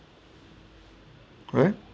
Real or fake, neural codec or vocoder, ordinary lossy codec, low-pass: real; none; none; none